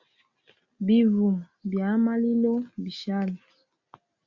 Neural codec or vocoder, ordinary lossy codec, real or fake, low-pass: none; Opus, 64 kbps; real; 7.2 kHz